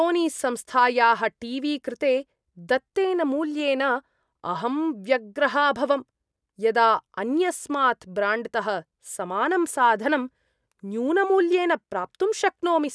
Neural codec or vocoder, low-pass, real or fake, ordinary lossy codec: none; none; real; none